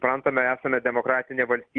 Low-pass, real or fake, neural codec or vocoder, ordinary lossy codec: 9.9 kHz; real; none; Opus, 32 kbps